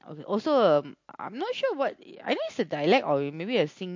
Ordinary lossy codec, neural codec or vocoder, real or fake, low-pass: MP3, 48 kbps; none; real; 7.2 kHz